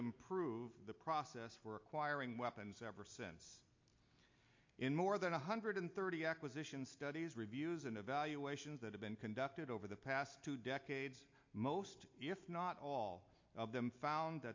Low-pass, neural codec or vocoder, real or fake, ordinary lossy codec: 7.2 kHz; none; real; MP3, 48 kbps